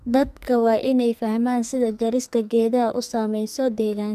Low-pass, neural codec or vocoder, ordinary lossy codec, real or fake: 14.4 kHz; codec, 44.1 kHz, 2.6 kbps, SNAC; none; fake